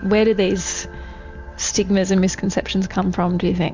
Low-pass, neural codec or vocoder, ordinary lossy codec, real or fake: 7.2 kHz; none; MP3, 64 kbps; real